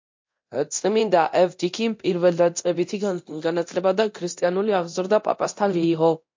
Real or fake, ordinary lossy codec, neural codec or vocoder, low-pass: fake; MP3, 48 kbps; codec, 24 kHz, 0.9 kbps, DualCodec; 7.2 kHz